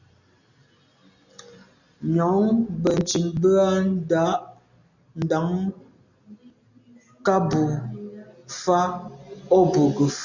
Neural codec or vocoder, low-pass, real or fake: none; 7.2 kHz; real